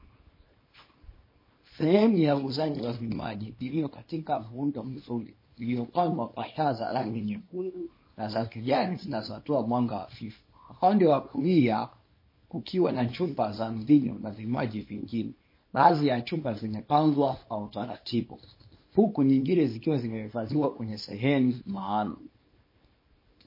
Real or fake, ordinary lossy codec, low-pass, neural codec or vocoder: fake; MP3, 24 kbps; 5.4 kHz; codec, 24 kHz, 0.9 kbps, WavTokenizer, small release